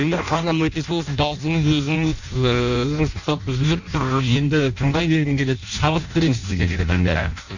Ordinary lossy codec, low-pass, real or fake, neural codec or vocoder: none; 7.2 kHz; fake; codec, 16 kHz in and 24 kHz out, 0.6 kbps, FireRedTTS-2 codec